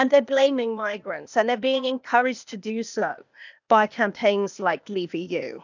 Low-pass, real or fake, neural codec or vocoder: 7.2 kHz; fake; codec, 16 kHz, 0.8 kbps, ZipCodec